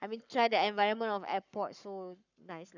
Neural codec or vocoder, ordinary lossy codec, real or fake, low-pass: codec, 44.1 kHz, 7.8 kbps, Pupu-Codec; none; fake; 7.2 kHz